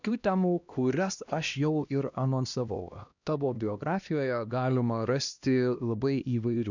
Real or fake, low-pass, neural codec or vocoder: fake; 7.2 kHz; codec, 16 kHz, 1 kbps, X-Codec, HuBERT features, trained on LibriSpeech